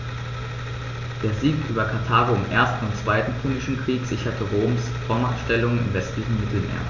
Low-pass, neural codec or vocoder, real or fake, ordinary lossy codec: 7.2 kHz; none; real; none